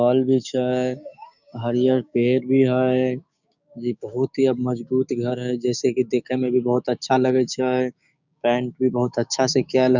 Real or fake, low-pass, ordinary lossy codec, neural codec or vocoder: fake; 7.2 kHz; none; codec, 16 kHz, 6 kbps, DAC